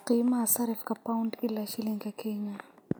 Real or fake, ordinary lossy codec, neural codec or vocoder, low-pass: real; none; none; none